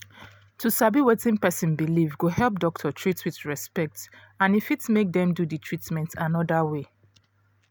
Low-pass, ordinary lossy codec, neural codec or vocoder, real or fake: none; none; none; real